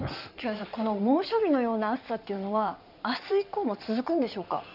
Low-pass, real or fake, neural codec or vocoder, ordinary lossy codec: 5.4 kHz; fake; codec, 16 kHz in and 24 kHz out, 2.2 kbps, FireRedTTS-2 codec; none